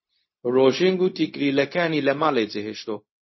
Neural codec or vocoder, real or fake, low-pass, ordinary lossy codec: codec, 16 kHz, 0.4 kbps, LongCat-Audio-Codec; fake; 7.2 kHz; MP3, 24 kbps